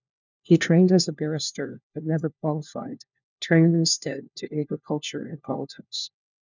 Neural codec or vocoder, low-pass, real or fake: codec, 16 kHz, 1 kbps, FunCodec, trained on LibriTTS, 50 frames a second; 7.2 kHz; fake